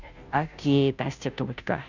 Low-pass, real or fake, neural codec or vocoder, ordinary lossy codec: 7.2 kHz; fake; codec, 16 kHz, 0.5 kbps, FunCodec, trained on Chinese and English, 25 frames a second; none